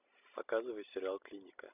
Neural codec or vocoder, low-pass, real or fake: none; 3.6 kHz; real